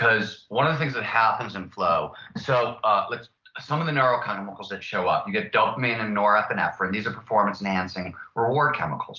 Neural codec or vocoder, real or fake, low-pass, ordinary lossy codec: none; real; 7.2 kHz; Opus, 32 kbps